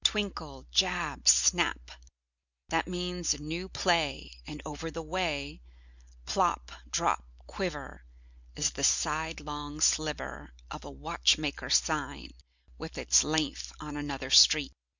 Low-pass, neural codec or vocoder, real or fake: 7.2 kHz; none; real